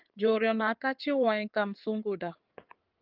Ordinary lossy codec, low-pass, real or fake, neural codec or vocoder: Opus, 24 kbps; 5.4 kHz; fake; codec, 16 kHz in and 24 kHz out, 2.2 kbps, FireRedTTS-2 codec